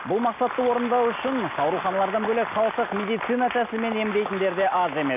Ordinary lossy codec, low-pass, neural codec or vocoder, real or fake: none; 3.6 kHz; none; real